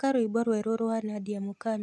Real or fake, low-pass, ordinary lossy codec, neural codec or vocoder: real; none; none; none